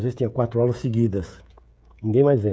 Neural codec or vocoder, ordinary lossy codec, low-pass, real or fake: codec, 16 kHz, 16 kbps, FreqCodec, smaller model; none; none; fake